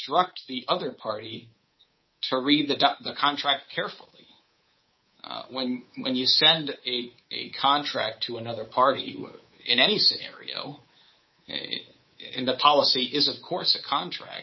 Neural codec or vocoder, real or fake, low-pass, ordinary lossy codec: codec, 24 kHz, 3.1 kbps, DualCodec; fake; 7.2 kHz; MP3, 24 kbps